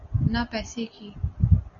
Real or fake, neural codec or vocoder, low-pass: real; none; 7.2 kHz